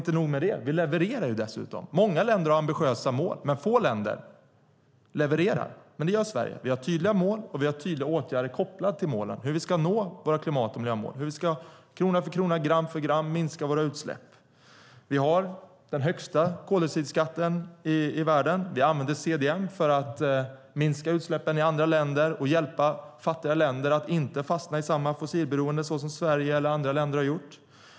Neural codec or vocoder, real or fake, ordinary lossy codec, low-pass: none; real; none; none